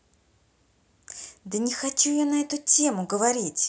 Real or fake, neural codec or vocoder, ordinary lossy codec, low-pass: real; none; none; none